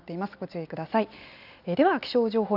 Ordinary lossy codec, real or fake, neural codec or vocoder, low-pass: none; real; none; 5.4 kHz